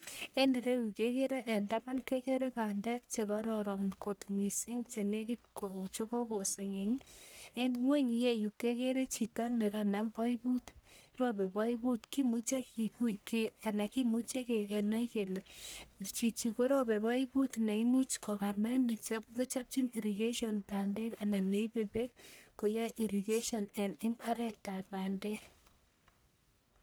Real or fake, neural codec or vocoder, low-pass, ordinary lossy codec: fake; codec, 44.1 kHz, 1.7 kbps, Pupu-Codec; none; none